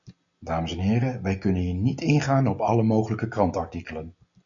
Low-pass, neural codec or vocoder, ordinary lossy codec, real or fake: 7.2 kHz; none; MP3, 64 kbps; real